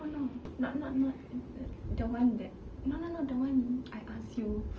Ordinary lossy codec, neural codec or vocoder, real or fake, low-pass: Opus, 24 kbps; none; real; 7.2 kHz